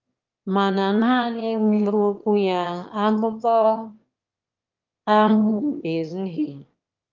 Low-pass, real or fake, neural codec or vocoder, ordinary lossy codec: 7.2 kHz; fake; autoencoder, 22.05 kHz, a latent of 192 numbers a frame, VITS, trained on one speaker; Opus, 32 kbps